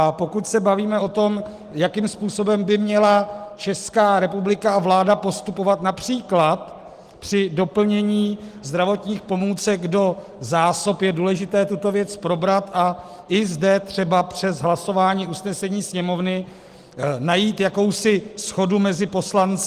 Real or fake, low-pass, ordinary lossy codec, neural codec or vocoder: real; 14.4 kHz; Opus, 24 kbps; none